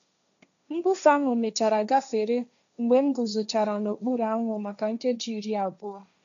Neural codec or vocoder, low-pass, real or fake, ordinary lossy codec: codec, 16 kHz, 1.1 kbps, Voila-Tokenizer; 7.2 kHz; fake; none